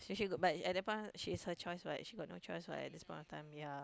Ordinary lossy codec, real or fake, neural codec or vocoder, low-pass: none; real; none; none